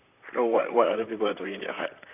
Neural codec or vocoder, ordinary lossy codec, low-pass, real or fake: vocoder, 44.1 kHz, 128 mel bands, Pupu-Vocoder; none; 3.6 kHz; fake